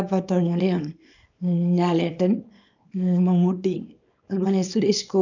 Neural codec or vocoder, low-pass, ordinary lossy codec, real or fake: codec, 24 kHz, 0.9 kbps, WavTokenizer, small release; 7.2 kHz; none; fake